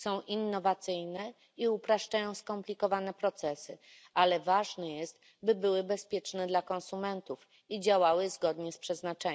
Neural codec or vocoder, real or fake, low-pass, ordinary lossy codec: none; real; none; none